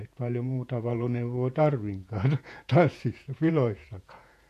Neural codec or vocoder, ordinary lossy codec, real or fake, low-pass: vocoder, 48 kHz, 128 mel bands, Vocos; AAC, 64 kbps; fake; 14.4 kHz